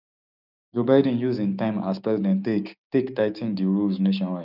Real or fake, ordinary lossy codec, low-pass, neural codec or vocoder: fake; none; 5.4 kHz; codec, 16 kHz, 6 kbps, DAC